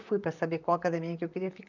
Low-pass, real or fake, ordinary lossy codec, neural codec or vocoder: 7.2 kHz; fake; none; vocoder, 44.1 kHz, 128 mel bands, Pupu-Vocoder